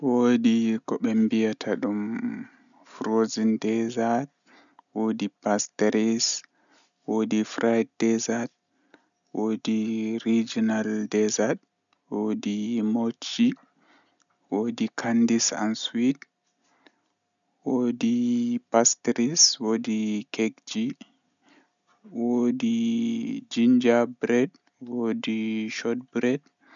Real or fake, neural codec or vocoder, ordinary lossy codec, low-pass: real; none; none; 7.2 kHz